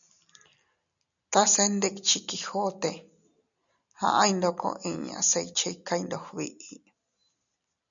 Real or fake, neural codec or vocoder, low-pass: real; none; 7.2 kHz